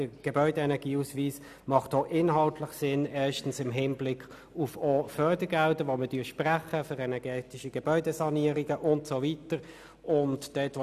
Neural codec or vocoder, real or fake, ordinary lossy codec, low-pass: none; real; none; 14.4 kHz